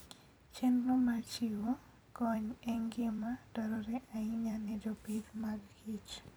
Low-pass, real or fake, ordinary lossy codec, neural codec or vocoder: none; fake; none; vocoder, 44.1 kHz, 128 mel bands every 256 samples, BigVGAN v2